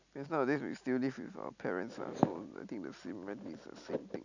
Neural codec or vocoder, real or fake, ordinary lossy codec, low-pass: none; real; none; 7.2 kHz